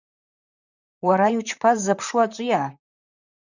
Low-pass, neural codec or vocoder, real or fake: 7.2 kHz; vocoder, 44.1 kHz, 128 mel bands, Pupu-Vocoder; fake